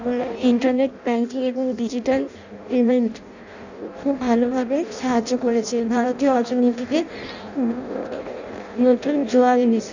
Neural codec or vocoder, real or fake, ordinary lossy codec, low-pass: codec, 16 kHz in and 24 kHz out, 0.6 kbps, FireRedTTS-2 codec; fake; none; 7.2 kHz